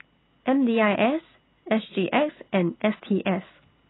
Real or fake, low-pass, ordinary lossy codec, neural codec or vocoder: real; 7.2 kHz; AAC, 16 kbps; none